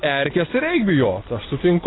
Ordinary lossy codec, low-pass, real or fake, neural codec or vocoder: AAC, 16 kbps; 7.2 kHz; real; none